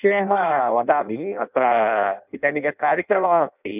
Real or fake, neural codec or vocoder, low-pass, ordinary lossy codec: fake; codec, 16 kHz in and 24 kHz out, 0.6 kbps, FireRedTTS-2 codec; 3.6 kHz; none